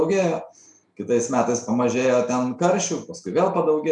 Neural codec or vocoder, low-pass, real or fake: none; 10.8 kHz; real